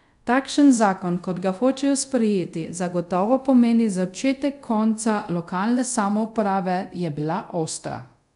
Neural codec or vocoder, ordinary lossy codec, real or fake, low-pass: codec, 24 kHz, 0.5 kbps, DualCodec; none; fake; 10.8 kHz